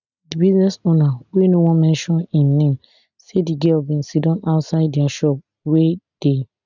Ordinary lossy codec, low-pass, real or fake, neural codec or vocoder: none; 7.2 kHz; real; none